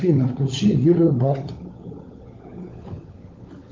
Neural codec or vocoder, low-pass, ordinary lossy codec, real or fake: codec, 16 kHz, 16 kbps, FunCodec, trained on LibriTTS, 50 frames a second; 7.2 kHz; Opus, 24 kbps; fake